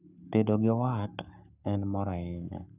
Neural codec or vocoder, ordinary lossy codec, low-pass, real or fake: codec, 44.1 kHz, 7.8 kbps, Pupu-Codec; none; 3.6 kHz; fake